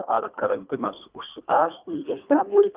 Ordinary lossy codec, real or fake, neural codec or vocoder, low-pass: Opus, 24 kbps; fake; codec, 24 kHz, 1.5 kbps, HILCodec; 3.6 kHz